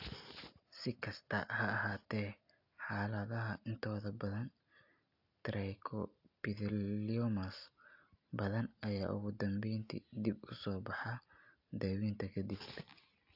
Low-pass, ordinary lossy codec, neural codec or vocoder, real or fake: 5.4 kHz; AAC, 48 kbps; none; real